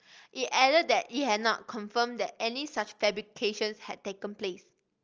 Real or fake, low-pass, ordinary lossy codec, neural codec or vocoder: real; 7.2 kHz; Opus, 24 kbps; none